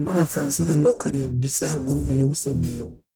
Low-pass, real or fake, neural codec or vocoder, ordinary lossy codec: none; fake; codec, 44.1 kHz, 0.9 kbps, DAC; none